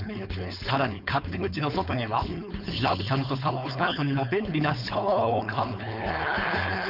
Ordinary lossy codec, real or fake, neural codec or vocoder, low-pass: none; fake; codec, 16 kHz, 4.8 kbps, FACodec; 5.4 kHz